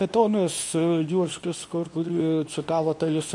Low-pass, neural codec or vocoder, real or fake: 10.8 kHz; codec, 24 kHz, 0.9 kbps, WavTokenizer, medium speech release version 2; fake